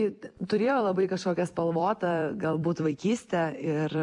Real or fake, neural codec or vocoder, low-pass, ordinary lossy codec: fake; vocoder, 44.1 kHz, 128 mel bands every 256 samples, BigVGAN v2; 10.8 kHz; MP3, 64 kbps